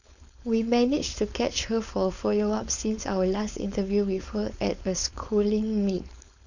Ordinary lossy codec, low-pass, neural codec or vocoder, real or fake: none; 7.2 kHz; codec, 16 kHz, 4.8 kbps, FACodec; fake